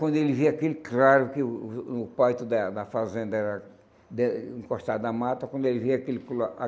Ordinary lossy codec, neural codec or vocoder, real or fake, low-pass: none; none; real; none